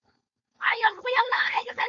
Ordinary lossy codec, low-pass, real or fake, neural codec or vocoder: MP3, 48 kbps; 7.2 kHz; fake; codec, 16 kHz, 4.8 kbps, FACodec